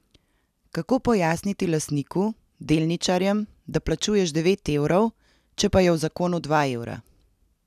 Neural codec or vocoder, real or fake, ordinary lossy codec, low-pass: none; real; none; 14.4 kHz